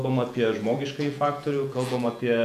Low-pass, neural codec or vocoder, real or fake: 14.4 kHz; vocoder, 48 kHz, 128 mel bands, Vocos; fake